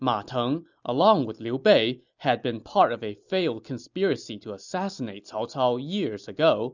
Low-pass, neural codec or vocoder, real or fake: 7.2 kHz; none; real